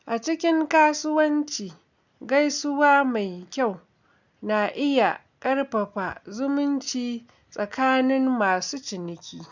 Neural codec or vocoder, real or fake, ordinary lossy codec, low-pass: none; real; none; 7.2 kHz